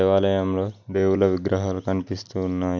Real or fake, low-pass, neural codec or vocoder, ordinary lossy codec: real; 7.2 kHz; none; none